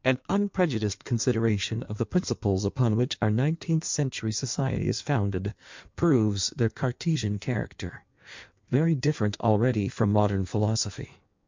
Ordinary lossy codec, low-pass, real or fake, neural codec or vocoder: MP3, 64 kbps; 7.2 kHz; fake; codec, 16 kHz in and 24 kHz out, 1.1 kbps, FireRedTTS-2 codec